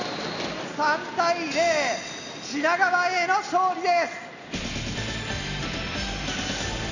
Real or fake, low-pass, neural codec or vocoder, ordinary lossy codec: real; 7.2 kHz; none; none